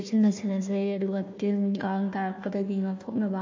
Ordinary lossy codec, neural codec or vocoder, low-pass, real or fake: MP3, 48 kbps; codec, 16 kHz, 1 kbps, FunCodec, trained on Chinese and English, 50 frames a second; 7.2 kHz; fake